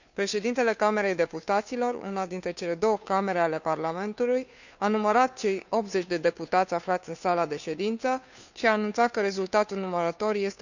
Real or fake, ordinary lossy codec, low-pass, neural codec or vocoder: fake; none; 7.2 kHz; codec, 16 kHz, 2 kbps, FunCodec, trained on Chinese and English, 25 frames a second